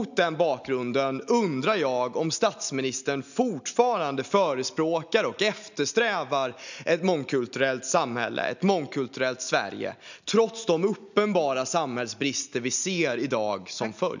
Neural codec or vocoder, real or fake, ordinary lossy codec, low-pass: none; real; none; 7.2 kHz